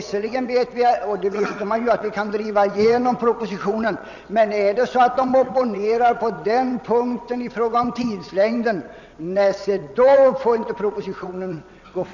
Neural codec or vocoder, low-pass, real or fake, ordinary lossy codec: vocoder, 22.05 kHz, 80 mel bands, WaveNeXt; 7.2 kHz; fake; none